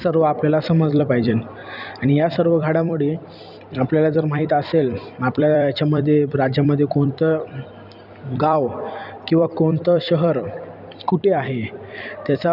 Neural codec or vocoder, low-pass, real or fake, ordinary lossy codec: vocoder, 44.1 kHz, 128 mel bands every 256 samples, BigVGAN v2; 5.4 kHz; fake; none